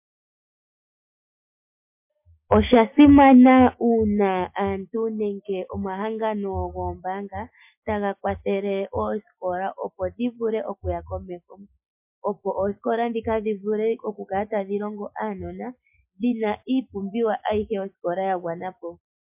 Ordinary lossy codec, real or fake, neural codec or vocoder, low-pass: MP3, 32 kbps; real; none; 3.6 kHz